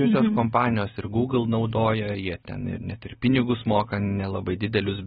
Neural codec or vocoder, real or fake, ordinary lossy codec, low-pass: none; real; AAC, 16 kbps; 7.2 kHz